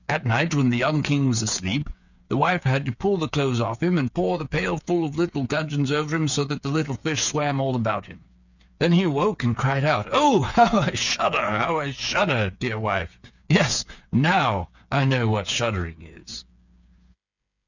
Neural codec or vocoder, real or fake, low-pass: codec, 16 kHz, 8 kbps, FreqCodec, smaller model; fake; 7.2 kHz